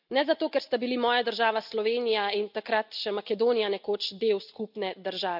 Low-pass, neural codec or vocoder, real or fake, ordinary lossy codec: 5.4 kHz; none; real; none